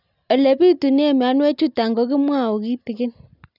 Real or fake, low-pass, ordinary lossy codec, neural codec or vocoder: real; 5.4 kHz; none; none